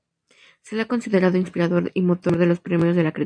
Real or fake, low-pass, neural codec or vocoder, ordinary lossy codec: real; 9.9 kHz; none; MP3, 64 kbps